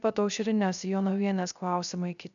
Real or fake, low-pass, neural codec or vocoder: fake; 7.2 kHz; codec, 16 kHz, 0.3 kbps, FocalCodec